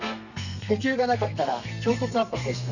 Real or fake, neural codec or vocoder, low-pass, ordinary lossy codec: fake; codec, 32 kHz, 1.9 kbps, SNAC; 7.2 kHz; none